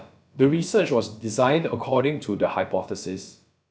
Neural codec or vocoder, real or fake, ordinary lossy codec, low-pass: codec, 16 kHz, about 1 kbps, DyCAST, with the encoder's durations; fake; none; none